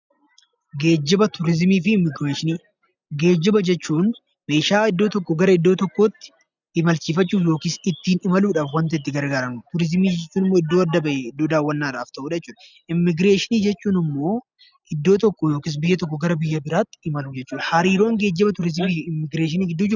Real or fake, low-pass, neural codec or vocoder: real; 7.2 kHz; none